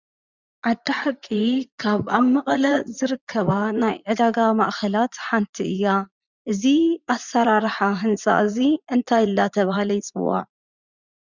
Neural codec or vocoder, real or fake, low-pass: vocoder, 44.1 kHz, 80 mel bands, Vocos; fake; 7.2 kHz